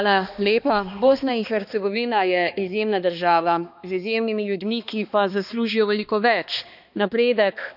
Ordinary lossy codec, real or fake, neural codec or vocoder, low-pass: none; fake; codec, 16 kHz, 2 kbps, X-Codec, HuBERT features, trained on balanced general audio; 5.4 kHz